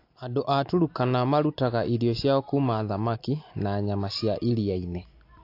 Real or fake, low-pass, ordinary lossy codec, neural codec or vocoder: real; 5.4 kHz; AAC, 32 kbps; none